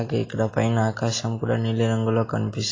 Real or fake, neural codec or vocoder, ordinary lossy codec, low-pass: real; none; AAC, 32 kbps; 7.2 kHz